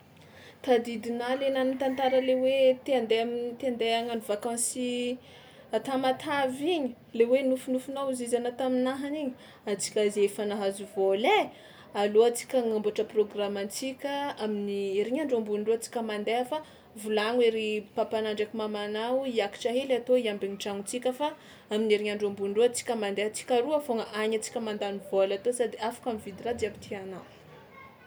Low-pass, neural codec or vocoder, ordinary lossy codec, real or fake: none; none; none; real